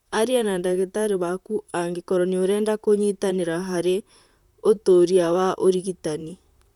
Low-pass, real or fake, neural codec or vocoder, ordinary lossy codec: 19.8 kHz; fake; vocoder, 44.1 kHz, 128 mel bands, Pupu-Vocoder; none